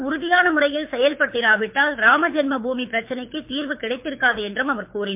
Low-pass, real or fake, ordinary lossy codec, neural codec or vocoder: 3.6 kHz; fake; MP3, 32 kbps; codec, 24 kHz, 6 kbps, HILCodec